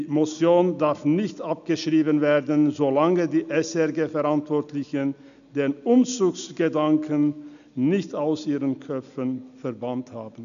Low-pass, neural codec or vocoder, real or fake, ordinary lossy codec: 7.2 kHz; none; real; none